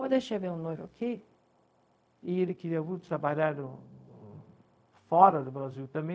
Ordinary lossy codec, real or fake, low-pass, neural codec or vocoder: none; fake; none; codec, 16 kHz, 0.4 kbps, LongCat-Audio-Codec